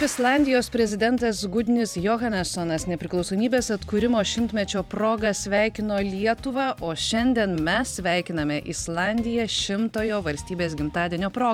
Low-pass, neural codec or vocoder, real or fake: 19.8 kHz; vocoder, 44.1 kHz, 128 mel bands every 512 samples, BigVGAN v2; fake